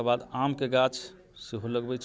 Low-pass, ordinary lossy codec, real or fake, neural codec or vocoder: none; none; real; none